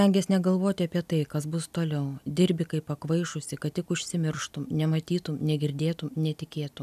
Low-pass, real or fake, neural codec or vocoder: 14.4 kHz; real; none